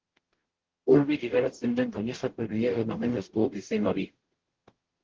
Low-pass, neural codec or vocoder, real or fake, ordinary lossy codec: 7.2 kHz; codec, 44.1 kHz, 0.9 kbps, DAC; fake; Opus, 16 kbps